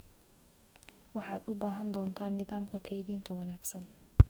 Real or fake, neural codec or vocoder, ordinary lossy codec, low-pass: fake; codec, 44.1 kHz, 2.6 kbps, DAC; none; none